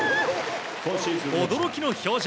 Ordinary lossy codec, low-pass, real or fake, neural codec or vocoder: none; none; real; none